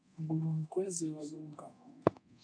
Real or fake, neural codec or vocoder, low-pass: fake; codec, 24 kHz, 0.9 kbps, DualCodec; 9.9 kHz